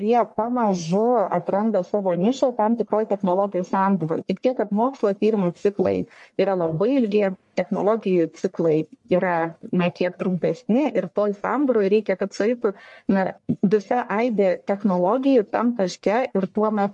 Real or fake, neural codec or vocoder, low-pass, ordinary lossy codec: fake; codec, 44.1 kHz, 1.7 kbps, Pupu-Codec; 10.8 kHz; MP3, 64 kbps